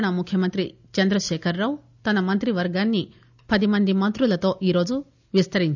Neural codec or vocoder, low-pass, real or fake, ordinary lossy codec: none; 7.2 kHz; real; none